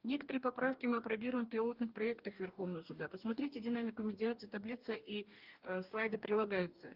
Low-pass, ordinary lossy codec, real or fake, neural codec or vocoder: 5.4 kHz; Opus, 24 kbps; fake; codec, 44.1 kHz, 2.6 kbps, DAC